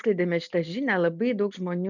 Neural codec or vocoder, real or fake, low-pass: none; real; 7.2 kHz